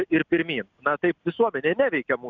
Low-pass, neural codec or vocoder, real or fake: 7.2 kHz; none; real